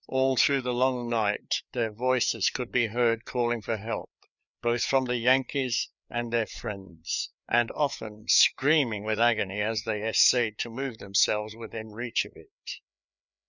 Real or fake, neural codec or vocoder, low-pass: fake; codec, 16 kHz, 4 kbps, FreqCodec, larger model; 7.2 kHz